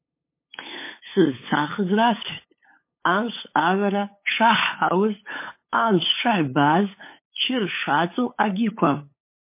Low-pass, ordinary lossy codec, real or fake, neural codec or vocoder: 3.6 kHz; MP3, 24 kbps; fake; codec, 16 kHz, 8 kbps, FunCodec, trained on LibriTTS, 25 frames a second